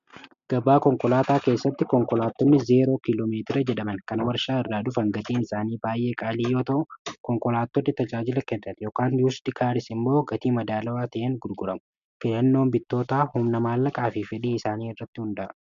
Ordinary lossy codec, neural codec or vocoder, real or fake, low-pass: MP3, 64 kbps; none; real; 7.2 kHz